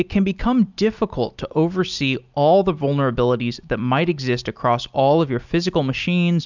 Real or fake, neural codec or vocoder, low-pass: real; none; 7.2 kHz